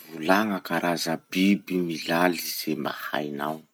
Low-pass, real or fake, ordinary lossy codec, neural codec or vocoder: none; real; none; none